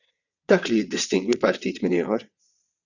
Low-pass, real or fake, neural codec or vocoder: 7.2 kHz; fake; vocoder, 22.05 kHz, 80 mel bands, WaveNeXt